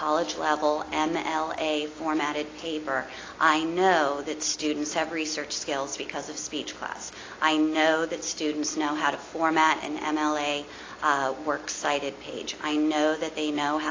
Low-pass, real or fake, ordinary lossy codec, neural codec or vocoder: 7.2 kHz; real; AAC, 32 kbps; none